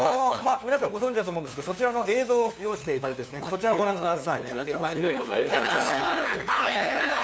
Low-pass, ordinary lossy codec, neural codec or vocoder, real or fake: none; none; codec, 16 kHz, 2 kbps, FunCodec, trained on LibriTTS, 25 frames a second; fake